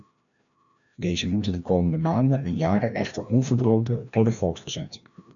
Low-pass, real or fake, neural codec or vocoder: 7.2 kHz; fake; codec, 16 kHz, 1 kbps, FreqCodec, larger model